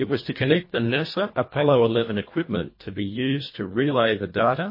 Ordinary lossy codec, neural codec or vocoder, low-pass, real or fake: MP3, 24 kbps; codec, 24 kHz, 1.5 kbps, HILCodec; 5.4 kHz; fake